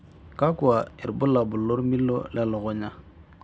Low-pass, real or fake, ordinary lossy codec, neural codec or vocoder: none; real; none; none